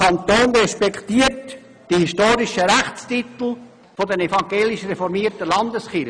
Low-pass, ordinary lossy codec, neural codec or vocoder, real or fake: 9.9 kHz; none; none; real